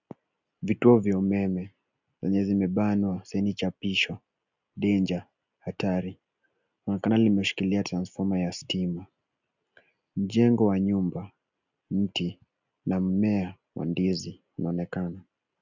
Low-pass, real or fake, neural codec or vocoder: 7.2 kHz; real; none